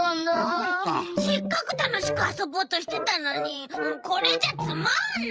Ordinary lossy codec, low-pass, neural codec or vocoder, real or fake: none; none; codec, 16 kHz, 8 kbps, FreqCodec, larger model; fake